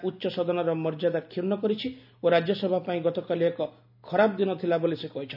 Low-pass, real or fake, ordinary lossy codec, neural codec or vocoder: 5.4 kHz; real; none; none